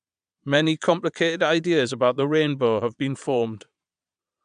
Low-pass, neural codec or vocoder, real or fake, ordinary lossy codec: 9.9 kHz; vocoder, 22.05 kHz, 80 mel bands, Vocos; fake; AAC, 96 kbps